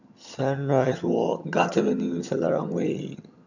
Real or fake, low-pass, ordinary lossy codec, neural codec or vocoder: fake; 7.2 kHz; none; vocoder, 22.05 kHz, 80 mel bands, HiFi-GAN